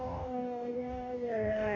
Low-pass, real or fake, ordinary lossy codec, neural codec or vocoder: 7.2 kHz; fake; none; codec, 44.1 kHz, 2.6 kbps, DAC